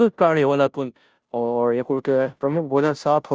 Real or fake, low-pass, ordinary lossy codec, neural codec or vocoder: fake; none; none; codec, 16 kHz, 0.5 kbps, FunCodec, trained on Chinese and English, 25 frames a second